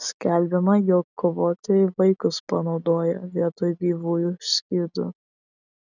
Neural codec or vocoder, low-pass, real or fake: none; 7.2 kHz; real